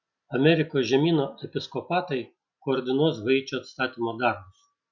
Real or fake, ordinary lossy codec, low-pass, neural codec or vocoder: real; Opus, 64 kbps; 7.2 kHz; none